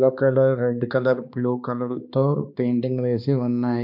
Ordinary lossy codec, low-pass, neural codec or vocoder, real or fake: MP3, 48 kbps; 5.4 kHz; codec, 16 kHz, 2 kbps, X-Codec, HuBERT features, trained on balanced general audio; fake